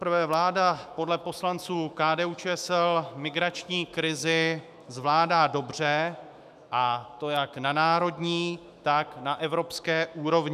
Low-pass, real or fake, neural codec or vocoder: 14.4 kHz; fake; autoencoder, 48 kHz, 128 numbers a frame, DAC-VAE, trained on Japanese speech